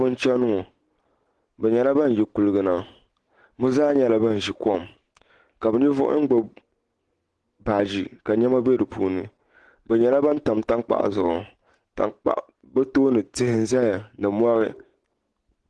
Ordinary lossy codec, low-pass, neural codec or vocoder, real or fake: Opus, 16 kbps; 10.8 kHz; none; real